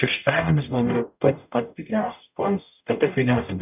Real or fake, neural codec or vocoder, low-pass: fake; codec, 44.1 kHz, 0.9 kbps, DAC; 3.6 kHz